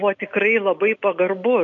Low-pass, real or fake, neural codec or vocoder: 7.2 kHz; real; none